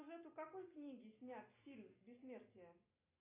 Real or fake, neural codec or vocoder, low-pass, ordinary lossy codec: real; none; 3.6 kHz; AAC, 16 kbps